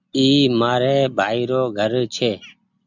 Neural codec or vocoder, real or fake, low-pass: none; real; 7.2 kHz